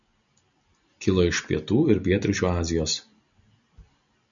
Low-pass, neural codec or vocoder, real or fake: 7.2 kHz; none; real